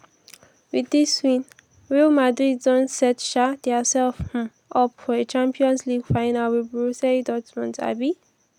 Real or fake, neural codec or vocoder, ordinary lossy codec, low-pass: real; none; none; 19.8 kHz